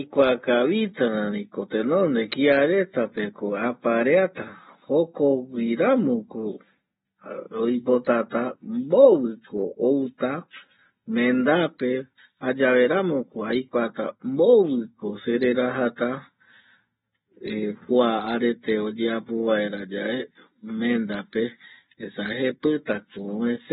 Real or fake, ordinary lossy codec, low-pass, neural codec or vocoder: real; AAC, 16 kbps; 19.8 kHz; none